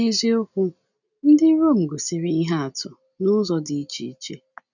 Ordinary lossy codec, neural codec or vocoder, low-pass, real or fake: none; none; 7.2 kHz; real